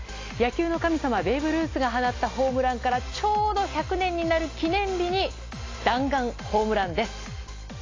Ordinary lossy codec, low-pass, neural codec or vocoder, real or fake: AAC, 32 kbps; 7.2 kHz; none; real